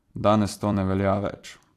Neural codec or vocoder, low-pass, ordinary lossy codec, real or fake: vocoder, 44.1 kHz, 128 mel bands every 256 samples, BigVGAN v2; 14.4 kHz; AAC, 64 kbps; fake